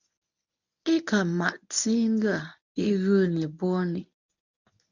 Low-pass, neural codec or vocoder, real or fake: 7.2 kHz; codec, 24 kHz, 0.9 kbps, WavTokenizer, medium speech release version 1; fake